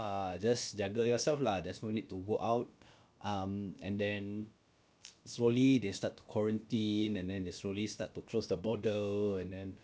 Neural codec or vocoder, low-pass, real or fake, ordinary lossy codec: codec, 16 kHz, about 1 kbps, DyCAST, with the encoder's durations; none; fake; none